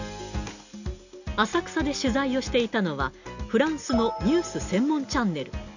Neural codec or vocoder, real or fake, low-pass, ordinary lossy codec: none; real; 7.2 kHz; none